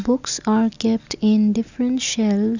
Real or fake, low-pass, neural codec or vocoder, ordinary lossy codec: real; 7.2 kHz; none; none